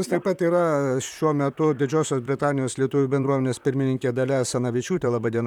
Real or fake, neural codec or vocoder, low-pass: fake; vocoder, 44.1 kHz, 128 mel bands, Pupu-Vocoder; 19.8 kHz